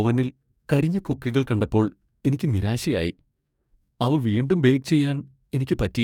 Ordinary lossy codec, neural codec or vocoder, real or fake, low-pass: none; codec, 44.1 kHz, 2.6 kbps, DAC; fake; 19.8 kHz